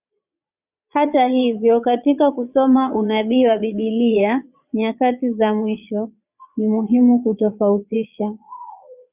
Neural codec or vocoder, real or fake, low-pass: vocoder, 22.05 kHz, 80 mel bands, Vocos; fake; 3.6 kHz